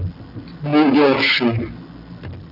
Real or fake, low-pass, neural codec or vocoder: real; 5.4 kHz; none